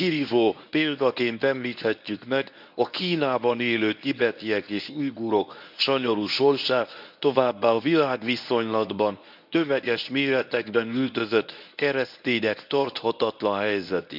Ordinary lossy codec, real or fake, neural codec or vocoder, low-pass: none; fake; codec, 24 kHz, 0.9 kbps, WavTokenizer, medium speech release version 1; 5.4 kHz